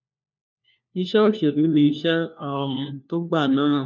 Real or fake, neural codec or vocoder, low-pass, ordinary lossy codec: fake; codec, 16 kHz, 1 kbps, FunCodec, trained on LibriTTS, 50 frames a second; 7.2 kHz; none